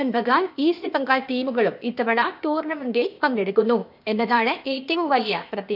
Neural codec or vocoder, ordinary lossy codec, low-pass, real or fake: codec, 16 kHz, 0.8 kbps, ZipCodec; none; 5.4 kHz; fake